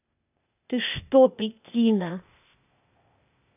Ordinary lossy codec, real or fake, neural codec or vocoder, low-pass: none; fake; codec, 16 kHz, 0.8 kbps, ZipCodec; 3.6 kHz